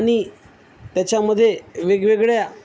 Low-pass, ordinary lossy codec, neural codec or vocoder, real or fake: none; none; none; real